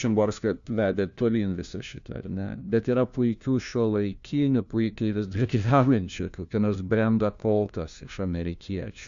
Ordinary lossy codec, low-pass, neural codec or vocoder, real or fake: Opus, 64 kbps; 7.2 kHz; codec, 16 kHz, 1 kbps, FunCodec, trained on LibriTTS, 50 frames a second; fake